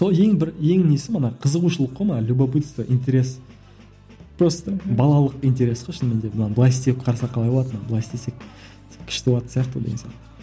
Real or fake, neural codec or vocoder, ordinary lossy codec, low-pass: real; none; none; none